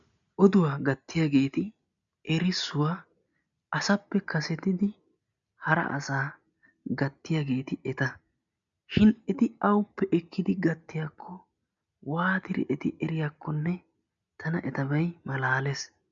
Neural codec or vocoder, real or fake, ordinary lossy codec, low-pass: none; real; MP3, 96 kbps; 7.2 kHz